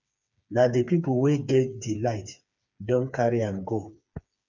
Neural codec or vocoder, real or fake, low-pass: codec, 16 kHz, 4 kbps, FreqCodec, smaller model; fake; 7.2 kHz